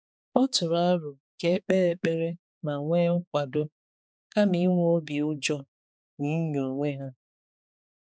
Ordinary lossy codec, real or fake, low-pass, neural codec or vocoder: none; fake; none; codec, 16 kHz, 4 kbps, X-Codec, HuBERT features, trained on balanced general audio